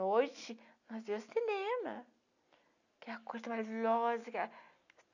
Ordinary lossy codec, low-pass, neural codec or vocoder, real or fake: none; 7.2 kHz; none; real